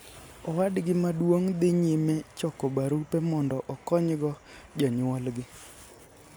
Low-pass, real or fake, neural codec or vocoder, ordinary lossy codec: none; real; none; none